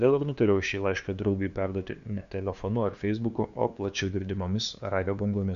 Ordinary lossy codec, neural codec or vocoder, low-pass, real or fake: Opus, 64 kbps; codec, 16 kHz, 2 kbps, FunCodec, trained on LibriTTS, 25 frames a second; 7.2 kHz; fake